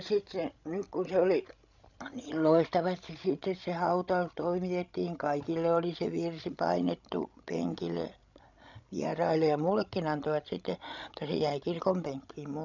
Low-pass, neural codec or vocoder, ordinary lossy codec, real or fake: 7.2 kHz; codec, 16 kHz, 16 kbps, FreqCodec, larger model; none; fake